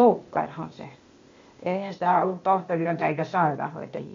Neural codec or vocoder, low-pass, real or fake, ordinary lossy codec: codec, 16 kHz, 0.8 kbps, ZipCodec; 7.2 kHz; fake; MP3, 48 kbps